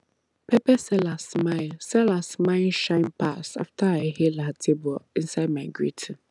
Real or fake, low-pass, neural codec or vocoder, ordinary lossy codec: real; 10.8 kHz; none; none